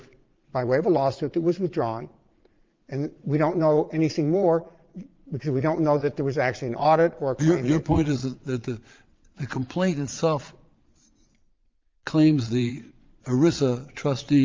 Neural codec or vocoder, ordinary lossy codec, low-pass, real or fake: vocoder, 22.05 kHz, 80 mel bands, Vocos; Opus, 24 kbps; 7.2 kHz; fake